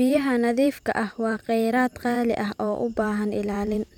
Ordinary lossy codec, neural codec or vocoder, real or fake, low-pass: none; vocoder, 44.1 kHz, 128 mel bands every 512 samples, BigVGAN v2; fake; 19.8 kHz